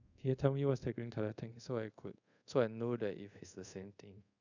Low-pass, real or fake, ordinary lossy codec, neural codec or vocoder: 7.2 kHz; fake; none; codec, 24 kHz, 0.5 kbps, DualCodec